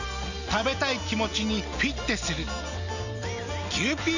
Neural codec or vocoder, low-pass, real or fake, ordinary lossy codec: none; 7.2 kHz; real; none